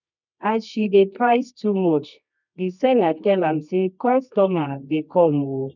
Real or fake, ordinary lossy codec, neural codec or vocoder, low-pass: fake; none; codec, 24 kHz, 0.9 kbps, WavTokenizer, medium music audio release; 7.2 kHz